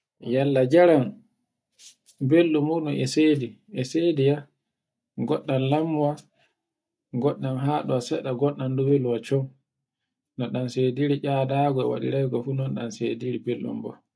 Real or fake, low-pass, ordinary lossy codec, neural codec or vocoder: real; none; none; none